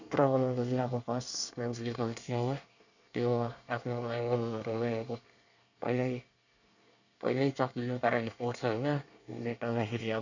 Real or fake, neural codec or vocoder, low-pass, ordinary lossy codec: fake; codec, 24 kHz, 1 kbps, SNAC; 7.2 kHz; none